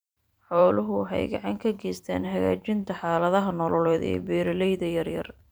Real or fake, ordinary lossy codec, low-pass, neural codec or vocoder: fake; none; none; vocoder, 44.1 kHz, 128 mel bands every 512 samples, BigVGAN v2